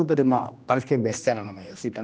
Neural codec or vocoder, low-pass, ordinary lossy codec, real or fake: codec, 16 kHz, 1 kbps, X-Codec, HuBERT features, trained on general audio; none; none; fake